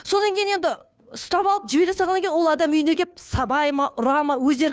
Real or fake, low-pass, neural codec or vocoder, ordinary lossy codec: fake; none; codec, 16 kHz, 2 kbps, FunCodec, trained on Chinese and English, 25 frames a second; none